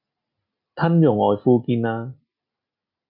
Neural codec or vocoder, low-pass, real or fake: none; 5.4 kHz; real